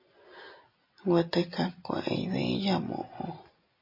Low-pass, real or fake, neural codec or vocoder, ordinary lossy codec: 5.4 kHz; real; none; MP3, 32 kbps